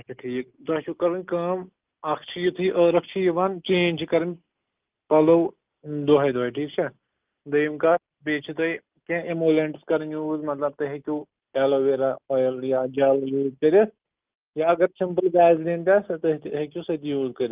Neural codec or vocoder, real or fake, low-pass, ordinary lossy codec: none; real; 3.6 kHz; Opus, 32 kbps